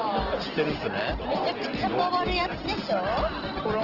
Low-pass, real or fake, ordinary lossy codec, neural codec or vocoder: 5.4 kHz; real; Opus, 16 kbps; none